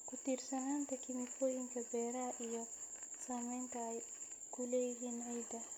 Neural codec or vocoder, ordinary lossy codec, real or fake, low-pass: none; none; real; none